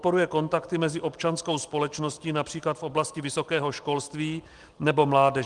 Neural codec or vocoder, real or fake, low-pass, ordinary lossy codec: none; real; 10.8 kHz; Opus, 24 kbps